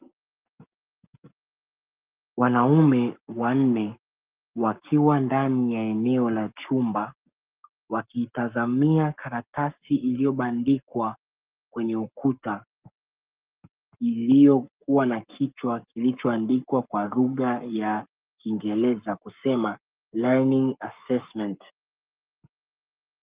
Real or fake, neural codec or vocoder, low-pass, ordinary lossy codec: fake; codec, 44.1 kHz, 7.8 kbps, Pupu-Codec; 3.6 kHz; Opus, 32 kbps